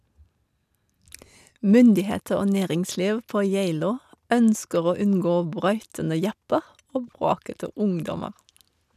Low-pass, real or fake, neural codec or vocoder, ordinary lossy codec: 14.4 kHz; real; none; none